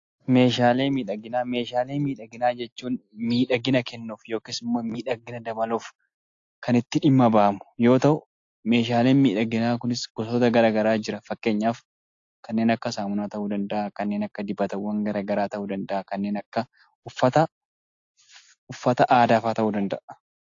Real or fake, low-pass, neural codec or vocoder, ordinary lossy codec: real; 7.2 kHz; none; AAC, 48 kbps